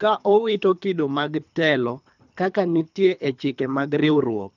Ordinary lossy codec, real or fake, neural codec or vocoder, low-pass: none; fake; codec, 24 kHz, 3 kbps, HILCodec; 7.2 kHz